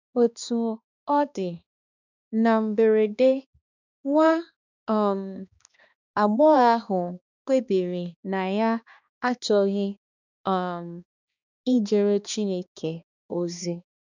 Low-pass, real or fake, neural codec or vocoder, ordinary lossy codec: 7.2 kHz; fake; codec, 16 kHz, 2 kbps, X-Codec, HuBERT features, trained on balanced general audio; none